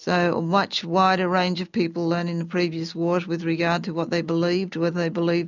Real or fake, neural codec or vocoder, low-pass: real; none; 7.2 kHz